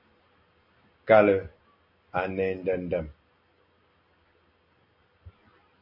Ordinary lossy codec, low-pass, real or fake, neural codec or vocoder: MP3, 32 kbps; 5.4 kHz; real; none